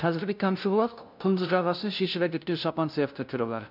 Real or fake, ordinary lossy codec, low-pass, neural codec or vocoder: fake; none; 5.4 kHz; codec, 16 kHz, 0.5 kbps, FunCodec, trained on LibriTTS, 25 frames a second